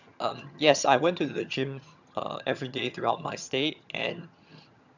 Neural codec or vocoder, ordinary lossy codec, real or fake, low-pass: vocoder, 22.05 kHz, 80 mel bands, HiFi-GAN; none; fake; 7.2 kHz